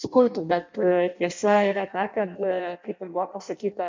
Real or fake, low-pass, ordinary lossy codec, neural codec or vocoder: fake; 7.2 kHz; MP3, 64 kbps; codec, 16 kHz in and 24 kHz out, 0.6 kbps, FireRedTTS-2 codec